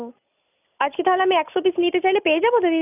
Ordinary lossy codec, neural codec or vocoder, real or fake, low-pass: none; none; real; 3.6 kHz